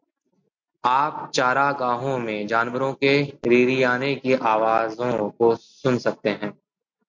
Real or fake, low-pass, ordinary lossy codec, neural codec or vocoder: real; 7.2 kHz; MP3, 64 kbps; none